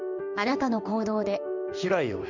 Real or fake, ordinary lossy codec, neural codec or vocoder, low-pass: fake; none; codec, 16 kHz in and 24 kHz out, 1 kbps, XY-Tokenizer; 7.2 kHz